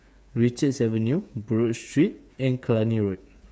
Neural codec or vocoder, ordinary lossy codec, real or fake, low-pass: codec, 16 kHz, 8 kbps, FreqCodec, smaller model; none; fake; none